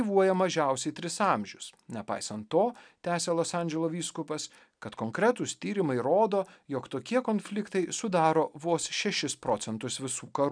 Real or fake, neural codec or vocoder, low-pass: real; none; 9.9 kHz